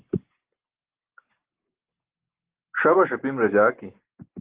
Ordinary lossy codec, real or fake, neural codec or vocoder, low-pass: Opus, 16 kbps; real; none; 3.6 kHz